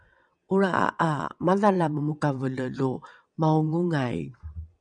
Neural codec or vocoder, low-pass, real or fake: vocoder, 22.05 kHz, 80 mel bands, WaveNeXt; 9.9 kHz; fake